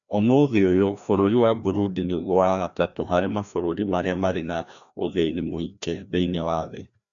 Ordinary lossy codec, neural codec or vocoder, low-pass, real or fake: none; codec, 16 kHz, 1 kbps, FreqCodec, larger model; 7.2 kHz; fake